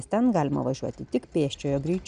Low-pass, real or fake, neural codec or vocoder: 9.9 kHz; real; none